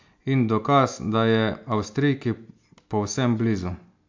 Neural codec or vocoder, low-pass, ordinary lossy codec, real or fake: none; 7.2 kHz; MP3, 64 kbps; real